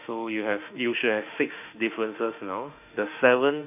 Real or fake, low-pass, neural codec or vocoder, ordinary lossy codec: fake; 3.6 kHz; autoencoder, 48 kHz, 32 numbers a frame, DAC-VAE, trained on Japanese speech; none